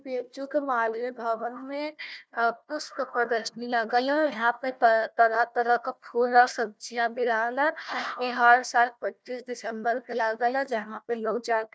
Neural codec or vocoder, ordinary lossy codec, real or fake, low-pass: codec, 16 kHz, 1 kbps, FunCodec, trained on Chinese and English, 50 frames a second; none; fake; none